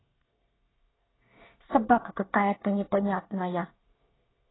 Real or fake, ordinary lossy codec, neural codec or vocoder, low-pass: fake; AAC, 16 kbps; codec, 24 kHz, 1 kbps, SNAC; 7.2 kHz